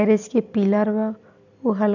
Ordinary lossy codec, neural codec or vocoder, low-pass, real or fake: none; none; 7.2 kHz; real